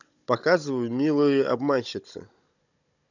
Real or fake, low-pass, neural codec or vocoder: fake; 7.2 kHz; codec, 16 kHz, 16 kbps, FunCodec, trained on Chinese and English, 50 frames a second